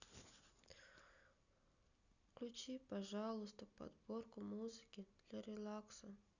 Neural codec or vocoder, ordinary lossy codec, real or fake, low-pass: none; none; real; 7.2 kHz